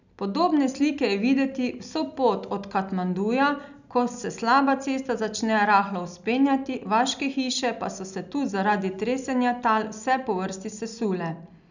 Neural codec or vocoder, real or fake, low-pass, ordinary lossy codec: none; real; 7.2 kHz; none